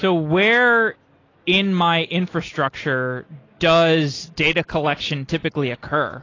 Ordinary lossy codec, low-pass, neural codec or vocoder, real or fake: AAC, 32 kbps; 7.2 kHz; none; real